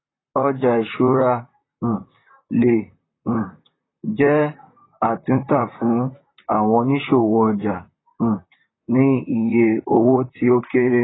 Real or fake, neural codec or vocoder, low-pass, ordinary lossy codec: fake; vocoder, 44.1 kHz, 128 mel bands, Pupu-Vocoder; 7.2 kHz; AAC, 16 kbps